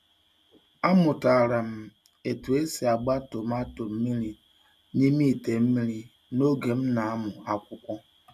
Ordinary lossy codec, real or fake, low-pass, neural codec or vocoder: none; real; 14.4 kHz; none